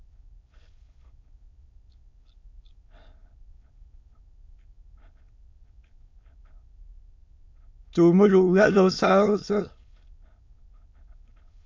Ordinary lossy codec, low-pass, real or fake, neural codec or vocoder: MP3, 48 kbps; 7.2 kHz; fake; autoencoder, 22.05 kHz, a latent of 192 numbers a frame, VITS, trained on many speakers